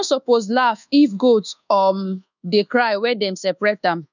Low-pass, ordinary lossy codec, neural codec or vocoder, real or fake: 7.2 kHz; none; codec, 24 kHz, 1.2 kbps, DualCodec; fake